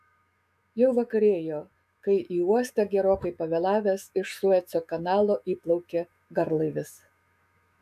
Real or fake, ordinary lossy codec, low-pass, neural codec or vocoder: fake; AAC, 96 kbps; 14.4 kHz; autoencoder, 48 kHz, 128 numbers a frame, DAC-VAE, trained on Japanese speech